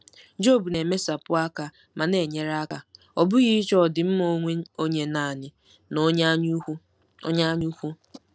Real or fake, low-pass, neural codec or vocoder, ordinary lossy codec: real; none; none; none